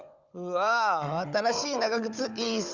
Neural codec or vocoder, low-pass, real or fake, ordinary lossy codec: codec, 16 kHz, 16 kbps, FunCodec, trained on Chinese and English, 50 frames a second; 7.2 kHz; fake; Opus, 64 kbps